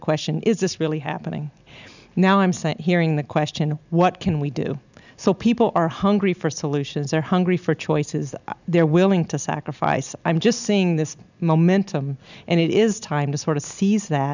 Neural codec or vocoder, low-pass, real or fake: none; 7.2 kHz; real